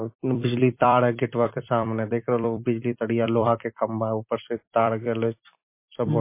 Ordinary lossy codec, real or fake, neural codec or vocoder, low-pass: MP3, 24 kbps; fake; vocoder, 44.1 kHz, 128 mel bands every 256 samples, BigVGAN v2; 3.6 kHz